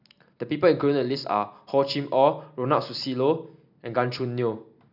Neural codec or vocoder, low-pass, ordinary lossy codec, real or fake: none; 5.4 kHz; none; real